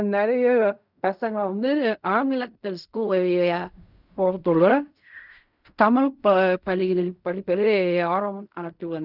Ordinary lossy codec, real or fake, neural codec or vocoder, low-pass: none; fake; codec, 16 kHz in and 24 kHz out, 0.4 kbps, LongCat-Audio-Codec, fine tuned four codebook decoder; 5.4 kHz